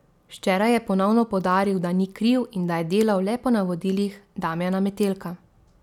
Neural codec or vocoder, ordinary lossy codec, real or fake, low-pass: none; none; real; 19.8 kHz